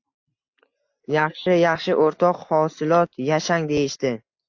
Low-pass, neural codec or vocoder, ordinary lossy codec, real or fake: 7.2 kHz; none; AAC, 48 kbps; real